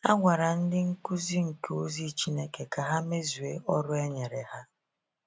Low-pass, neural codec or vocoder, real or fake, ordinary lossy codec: none; none; real; none